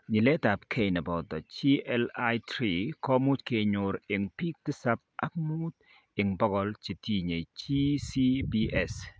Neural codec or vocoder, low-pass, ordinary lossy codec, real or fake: none; none; none; real